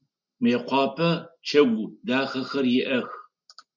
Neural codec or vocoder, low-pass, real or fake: none; 7.2 kHz; real